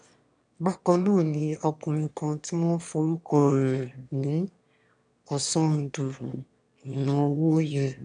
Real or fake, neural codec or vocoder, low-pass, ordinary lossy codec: fake; autoencoder, 22.05 kHz, a latent of 192 numbers a frame, VITS, trained on one speaker; 9.9 kHz; none